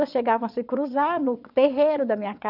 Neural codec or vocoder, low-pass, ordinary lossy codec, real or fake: vocoder, 22.05 kHz, 80 mel bands, WaveNeXt; 5.4 kHz; none; fake